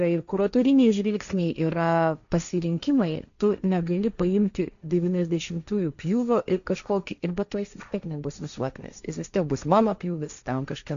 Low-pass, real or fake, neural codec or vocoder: 7.2 kHz; fake; codec, 16 kHz, 1.1 kbps, Voila-Tokenizer